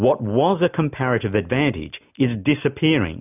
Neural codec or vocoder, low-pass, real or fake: none; 3.6 kHz; real